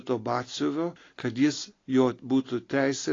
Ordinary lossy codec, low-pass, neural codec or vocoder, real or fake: AAC, 32 kbps; 7.2 kHz; none; real